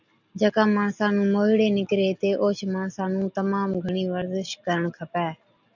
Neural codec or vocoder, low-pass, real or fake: none; 7.2 kHz; real